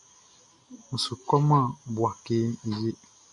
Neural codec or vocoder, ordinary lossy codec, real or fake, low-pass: none; MP3, 96 kbps; real; 10.8 kHz